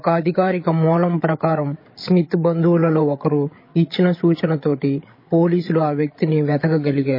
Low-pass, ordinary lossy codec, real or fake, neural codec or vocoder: 5.4 kHz; MP3, 24 kbps; fake; codec, 16 kHz, 8 kbps, FreqCodec, larger model